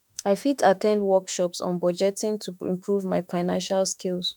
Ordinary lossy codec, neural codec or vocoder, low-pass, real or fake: none; autoencoder, 48 kHz, 32 numbers a frame, DAC-VAE, trained on Japanese speech; 19.8 kHz; fake